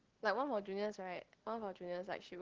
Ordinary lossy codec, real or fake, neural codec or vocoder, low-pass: Opus, 16 kbps; real; none; 7.2 kHz